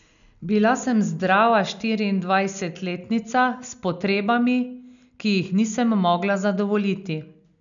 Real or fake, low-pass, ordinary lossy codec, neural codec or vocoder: real; 7.2 kHz; none; none